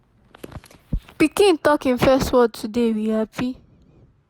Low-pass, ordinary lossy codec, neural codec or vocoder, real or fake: none; none; none; real